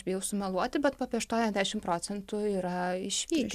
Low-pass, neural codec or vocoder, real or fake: 14.4 kHz; none; real